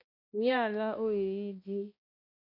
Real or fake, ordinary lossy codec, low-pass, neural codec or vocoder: fake; MP3, 32 kbps; 5.4 kHz; codec, 16 kHz, 1 kbps, X-Codec, HuBERT features, trained on balanced general audio